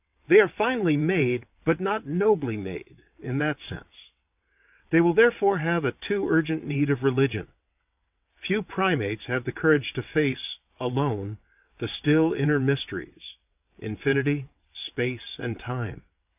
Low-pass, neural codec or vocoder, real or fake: 3.6 kHz; vocoder, 22.05 kHz, 80 mel bands, WaveNeXt; fake